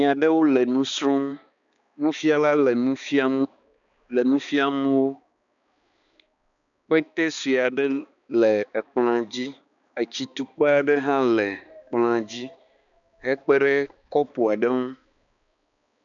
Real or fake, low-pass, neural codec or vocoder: fake; 7.2 kHz; codec, 16 kHz, 2 kbps, X-Codec, HuBERT features, trained on balanced general audio